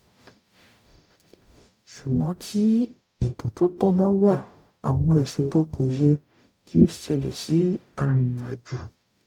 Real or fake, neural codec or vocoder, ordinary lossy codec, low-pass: fake; codec, 44.1 kHz, 0.9 kbps, DAC; none; 19.8 kHz